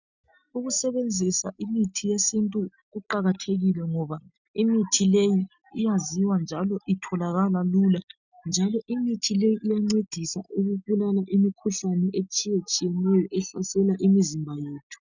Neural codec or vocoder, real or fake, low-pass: none; real; 7.2 kHz